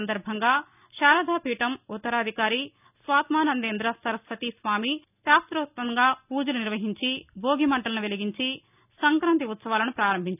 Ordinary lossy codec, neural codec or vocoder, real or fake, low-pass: none; none; real; 3.6 kHz